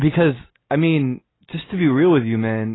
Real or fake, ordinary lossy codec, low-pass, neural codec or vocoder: real; AAC, 16 kbps; 7.2 kHz; none